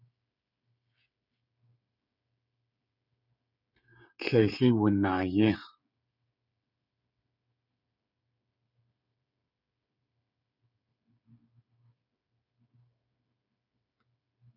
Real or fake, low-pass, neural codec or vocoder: fake; 5.4 kHz; codec, 16 kHz, 16 kbps, FreqCodec, smaller model